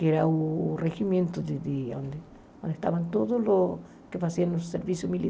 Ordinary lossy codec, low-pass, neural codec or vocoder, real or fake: none; none; none; real